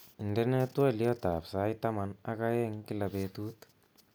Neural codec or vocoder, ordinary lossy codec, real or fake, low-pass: none; none; real; none